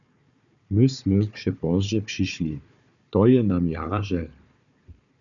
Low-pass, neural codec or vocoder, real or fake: 7.2 kHz; codec, 16 kHz, 4 kbps, FunCodec, trained on Chinese and English, 50 frames a second; fake